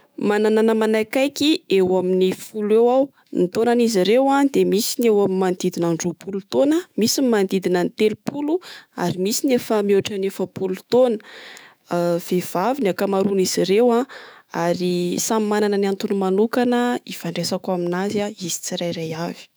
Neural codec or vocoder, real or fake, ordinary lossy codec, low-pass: autoencoder, 48 kHz, 128 numbers a frame, DAC-VAE, trained on Japanese speech; fake; none; none